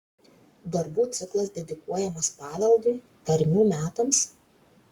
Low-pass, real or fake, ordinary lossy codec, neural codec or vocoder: 19.8 kHz; fake; Opus, 64 kbps; codec, 44.1 kHz, 7.8 kbps, Pupu-Codec